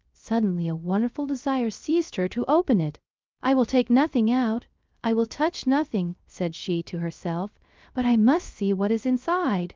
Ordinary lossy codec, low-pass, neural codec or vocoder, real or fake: Opus, 24 kbps; 7.2 kHz; codec, 16 kHz, 0.3 kbps, FocalCodec; fake